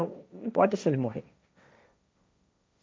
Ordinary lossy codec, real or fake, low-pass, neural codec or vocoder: none; fake; none; codec, 16 kHz, 1.1 kbps, Voila-Tokenizer